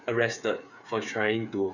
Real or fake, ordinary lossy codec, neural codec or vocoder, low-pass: fake; none; codec, 16 kHz, 8 kbps, FreqCodec, smaller model; 7.2 kHz